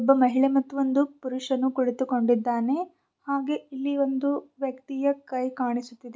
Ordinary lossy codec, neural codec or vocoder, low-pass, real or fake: none; none; none; real